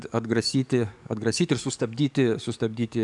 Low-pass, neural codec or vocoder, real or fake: 10.8 kHz; codec, 44.1 kHz, 7.8 kbps, DAC; fake